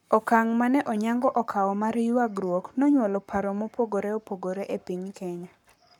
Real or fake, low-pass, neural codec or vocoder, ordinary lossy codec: fake; 19.8 kHz; codec, 44.1 kHz, 7.8 kbps, Pupu-Codec; none